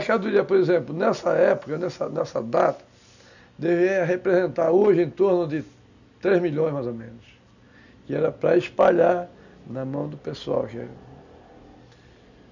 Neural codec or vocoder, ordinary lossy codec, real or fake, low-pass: none; none; real; 7.2 kHz